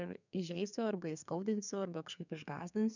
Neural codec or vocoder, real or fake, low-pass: codec, 32 kHz, 1.9 kbps, SNAC; fake; 7.2 kHz